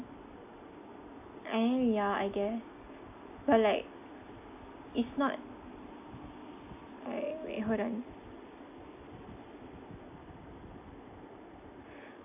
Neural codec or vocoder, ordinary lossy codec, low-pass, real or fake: none; AAC, 32 kbps; 3.6 kHz; real